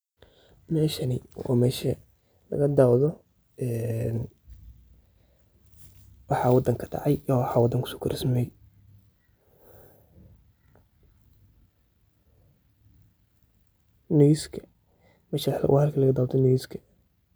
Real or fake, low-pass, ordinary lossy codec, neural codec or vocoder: real; none; none; none